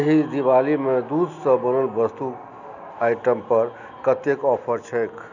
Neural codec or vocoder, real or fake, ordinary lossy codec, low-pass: none; real; none; 7.2 kHz